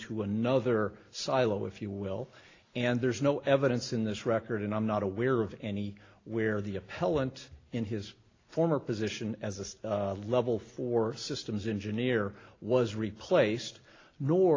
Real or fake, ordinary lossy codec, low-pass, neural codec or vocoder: real; AAC, 32 kbps; 7.2 kHz; none